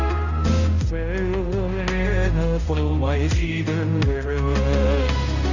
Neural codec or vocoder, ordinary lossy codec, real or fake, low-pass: codec, 16 kHz, 0.5 kbps, X-Codec, HuBERT features, trained on balanced general audio; none; fake; 7.2 kHz